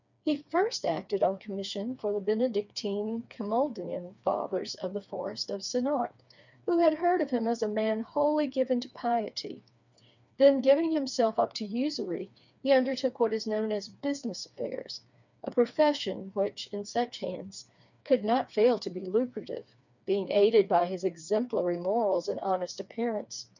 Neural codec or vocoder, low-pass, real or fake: codec, 16 kHz, 4 kbps, FreqCodec, smaller model; 7.2 kHz; fake